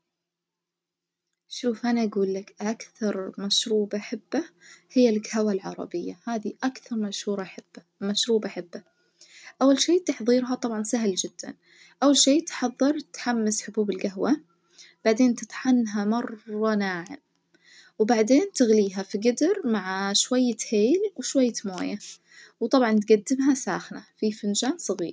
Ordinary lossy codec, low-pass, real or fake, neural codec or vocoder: none; none; real; none